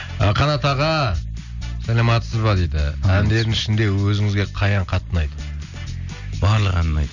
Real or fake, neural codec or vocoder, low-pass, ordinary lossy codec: real; none; 7.2 kHz; none